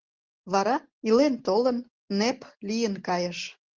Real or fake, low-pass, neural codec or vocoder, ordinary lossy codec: real; 7.2 kHz; none; Opus, 16 kbps